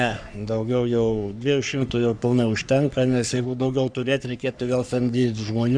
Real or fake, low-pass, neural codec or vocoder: fake; 9.9 kHz; codec, 44.1 kHz, 3.4 kbps, Pupu-Codec